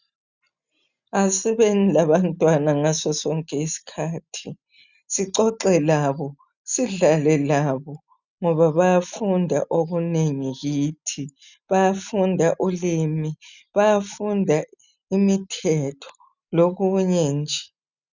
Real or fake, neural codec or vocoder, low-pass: real; none; 7.2 kHz